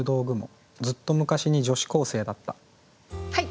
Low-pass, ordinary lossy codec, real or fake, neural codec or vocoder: none; none; real; none